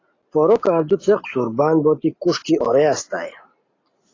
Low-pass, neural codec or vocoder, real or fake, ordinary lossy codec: 7.2 kHz; none; real; AAC, 32 kbps